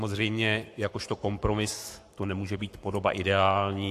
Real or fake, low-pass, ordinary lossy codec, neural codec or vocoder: fake; 14.4 kHz; AAC, 64 kbps; codec, 44.1 kHz, 7.8 kbps, Pupu-Codec